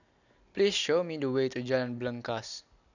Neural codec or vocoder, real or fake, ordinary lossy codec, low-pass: none; real; none; 7.2 kHz